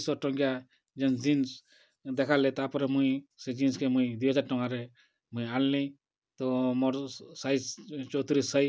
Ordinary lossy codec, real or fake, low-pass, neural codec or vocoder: none; real; none; none